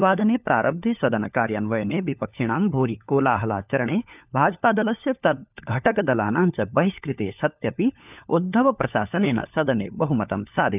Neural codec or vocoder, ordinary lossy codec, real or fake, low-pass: codec, 16 kHz, 4 kbps, FunCodec, trained on LibriTTS, 50 frames a second; none; fake; 3.6 kHz